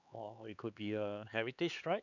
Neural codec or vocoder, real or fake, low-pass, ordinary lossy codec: codec, 16 kHz, 4 kbps, X-Codec, HuBERT features, trained on LibriSpeech; fake; 7.2 kHz; none